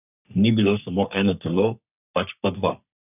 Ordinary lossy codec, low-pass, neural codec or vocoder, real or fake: none; 3.6 kHz; codec, 44.1 kHz, 3.4 kbps, Pupu-Codec; fake